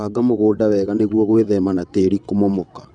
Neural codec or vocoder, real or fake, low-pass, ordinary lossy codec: vocoder, 22.05 kHz, 80 mel bands, WaveNeXt; fake; 9.9 kHz; none